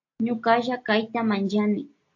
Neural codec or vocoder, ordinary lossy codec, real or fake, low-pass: none; AAC, 48 kbps; real; 7.2 kHz